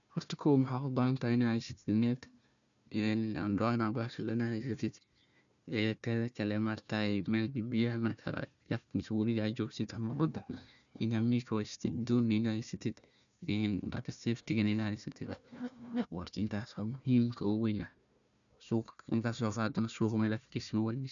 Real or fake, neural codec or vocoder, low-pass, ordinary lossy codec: fake; codec, 16 kHz, 1 kbps, FunCodec, trained on Chinese and English, 50 frames a second; 7.2 kHz; none